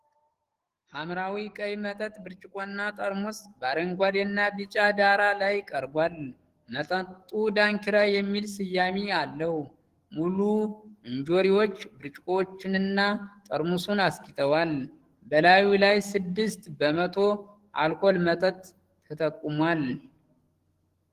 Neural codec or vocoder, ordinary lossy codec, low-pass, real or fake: codec, 44.1 kHz, 7.8 kbps, DAC; Opus, 16 kbps; 14.4 kHz; fake